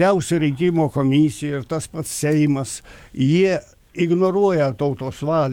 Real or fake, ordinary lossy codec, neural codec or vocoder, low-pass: fake; MP3, 96 kbps; codec, 44.1 kHz, 7.8 kbps, DAC; 19.8 kHz